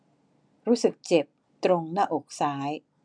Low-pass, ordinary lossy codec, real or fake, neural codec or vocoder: 9.9 kHz; none; real; none